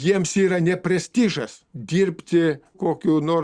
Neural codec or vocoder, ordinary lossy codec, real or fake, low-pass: none; Opus, 64 kbps; real; 9.9 kHz